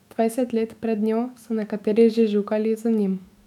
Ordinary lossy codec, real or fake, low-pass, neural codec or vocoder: none; fake; 19.8 kHz; autoencoder, 48 kHz, 128 numbers a frame, DAC-VAE, trained on Japanese speech